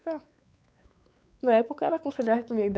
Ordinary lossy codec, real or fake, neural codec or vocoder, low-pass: none; fake; codec, 16 kHz, 4 kbps, X-Codec, WavLM features, trained on Multilingual LibriSpeech; none